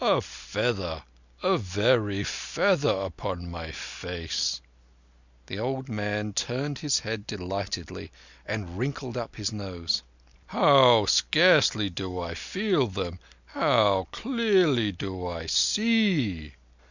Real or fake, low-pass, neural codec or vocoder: real; 7.2 kHz; none